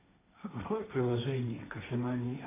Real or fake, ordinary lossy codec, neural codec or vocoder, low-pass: fake; AAC, 24 kbps; codec, 16 kHz, 1.1 kbps, Voila-Tokenizer; 3.6 kHz